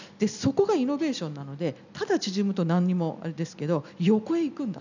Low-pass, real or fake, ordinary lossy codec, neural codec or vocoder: 7.2 kHz; real; none; none